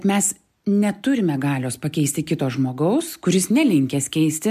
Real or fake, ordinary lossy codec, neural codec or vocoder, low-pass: real; MP3, 96 kbps; none; 14.4 kHz